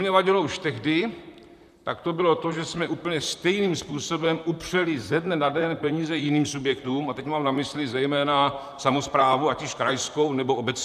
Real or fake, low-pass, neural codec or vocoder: fake; 14.4 kHz; vocoder, 44.1 kHz, 128 mel bands, Pupu-Vocoder